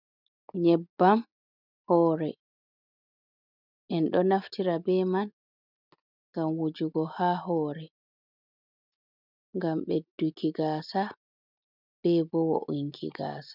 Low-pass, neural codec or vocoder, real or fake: 5.4 kHz; none; real